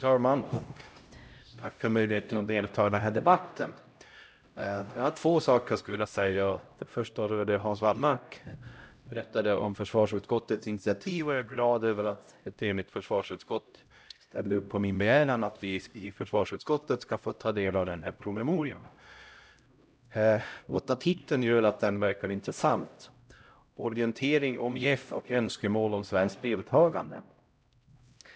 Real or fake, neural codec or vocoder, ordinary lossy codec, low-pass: fake; codec, 16 kHz, 0.5 kbps, X-Codec, HuBERT features, trained on LibriSpeech; none; none